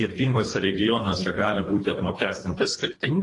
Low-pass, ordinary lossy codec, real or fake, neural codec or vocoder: 10.8 kHz; AAC, 32 kbps; fake; codec, 24 kHz, 1.5 kbps, HILCodec